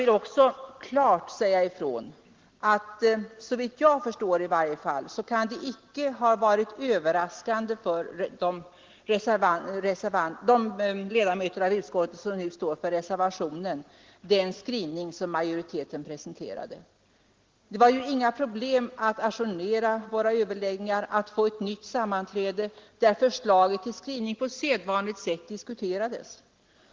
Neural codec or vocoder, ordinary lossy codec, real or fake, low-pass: none; Opus, 16 kbps; real; 7.2 kHz